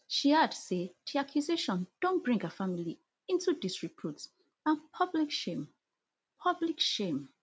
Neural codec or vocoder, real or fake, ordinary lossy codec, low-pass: none; real; none; none